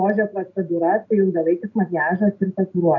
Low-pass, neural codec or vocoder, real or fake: 7.2 kHz; none; real